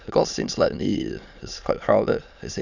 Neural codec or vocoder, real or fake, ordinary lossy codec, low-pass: autoencoder, 22.05 kHz, a latent of 192 numbers a frame, VITS, trained on many speakers; fake; none; 7.2 kHz